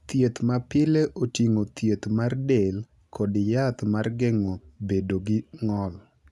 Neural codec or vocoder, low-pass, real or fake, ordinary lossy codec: none; none; real; none